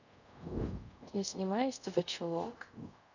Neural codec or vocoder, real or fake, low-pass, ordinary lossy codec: codec, 24 kHz, 0.5 kbps, DualCodec; fake; 7.2 kHz; none